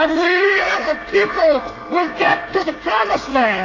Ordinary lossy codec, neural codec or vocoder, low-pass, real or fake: AAC, 32 kbps; codec, 24 kHz, 1 kbps, SNAC; 7.2 kHz; fake